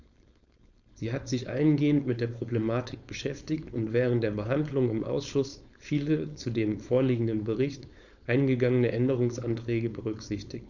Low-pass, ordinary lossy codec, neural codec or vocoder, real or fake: 7.2 kHz; none; codec, 16 kHz, 4.8 kbps, FACodec; fake